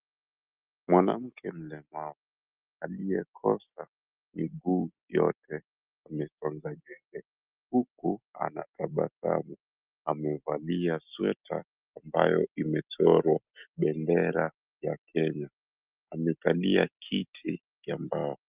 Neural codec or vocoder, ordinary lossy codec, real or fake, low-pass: none; Opus, 24 kbps; real; 3.6 kHz